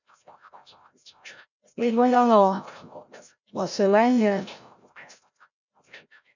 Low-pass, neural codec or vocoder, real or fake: 7.2 kHz; codec, 16 kHz, 0.5 kbps, FreqCodec, larger model; fake